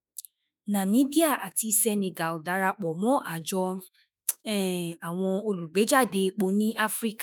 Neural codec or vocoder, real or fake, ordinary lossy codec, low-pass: autoencoder, 48 kHz, 32 numbers a frame, DAC-VAE, trained on Japanese speech; fake; none; none